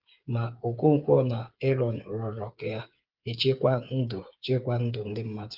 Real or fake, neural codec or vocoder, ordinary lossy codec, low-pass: fake; codec, 16 kHz, 8 kbps, FreqCodec, smaller model; Opus, 32 kbps; 5.4 kHz